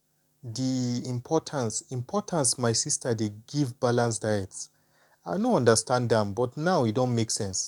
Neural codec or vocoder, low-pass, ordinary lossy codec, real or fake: codec, 44.1 kHz, 7.8 kbps, DAC; 19.8 kHz; none; fake